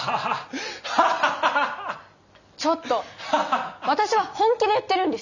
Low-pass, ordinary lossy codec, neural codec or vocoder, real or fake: 7.2 kHz; none; none; real